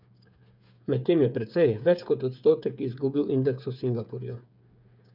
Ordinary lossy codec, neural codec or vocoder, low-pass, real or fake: none; codec, 16 kHz, 8 kbps, FreqCodec, smaller model; 5.4 kHz; fake